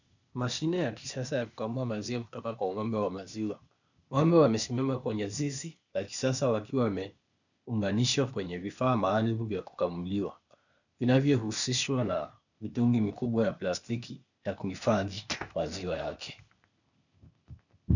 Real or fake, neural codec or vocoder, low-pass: fake; codec, 16 kHz, 0.8 kbps, ZipCodec; 7.2 kHz